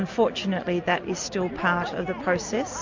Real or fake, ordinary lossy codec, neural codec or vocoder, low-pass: real; MP3, 48 kbps; none; 7.2 kHz